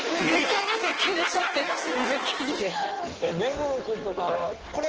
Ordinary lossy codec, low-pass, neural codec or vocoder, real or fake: Opus, 16 kbps; 7.2 kHz; codec, 16 kHz in and 24 kHz out, 1.1 kbps, FireRedTTS-2 codec; fake